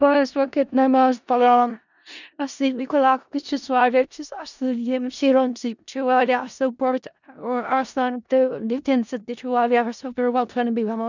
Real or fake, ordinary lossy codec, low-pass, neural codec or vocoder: fake; none; 7.2 kHz; codec, 16 kHz in and 24 kHz out, 0.4 kbps, LongCat-Audio-Codec, four codebook decoder